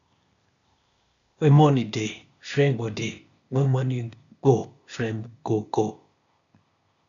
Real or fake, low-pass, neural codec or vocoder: fake; 7.2 kHz; codec, 16 kHz, 0.8 kbps, ZipCodec